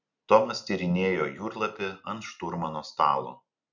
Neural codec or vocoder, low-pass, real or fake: none; 7.2 kHz; real